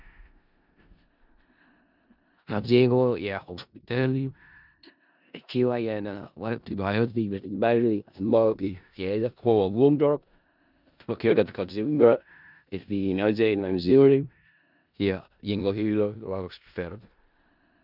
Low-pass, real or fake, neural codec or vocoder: 5.4 kHz; fake; codec, 16 kHz in and 24 kHz out, 0.4 kbps, LongCat-Audio-Codec, four codebook decoder